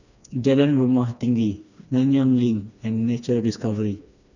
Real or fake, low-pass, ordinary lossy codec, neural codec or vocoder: fake; 7.2 kHz; none; codec, 16 kHz, 2 kbps, FreqCodec, smaller model